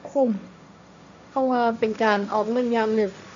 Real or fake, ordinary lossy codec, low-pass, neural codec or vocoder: fake; AAC, 64 kbps; 7.2 kHz; codec, 16 kHz, 1.1 kbps, Voila-Tokenizer